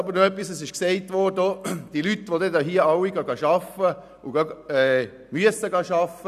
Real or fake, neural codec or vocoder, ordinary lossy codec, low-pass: real; none; none; 14.4 kHz